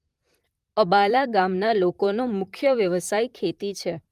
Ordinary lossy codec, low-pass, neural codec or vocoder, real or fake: Opus, 32 kbps; 14.4 kHz; vocoder, 44.1 kHz, 128 mel bands, Pupu-Vocoder; fake